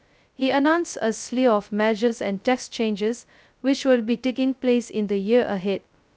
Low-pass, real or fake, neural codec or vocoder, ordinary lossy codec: none; fake; codec, 16 kHz, 0.2 kbps, FocalCodec; none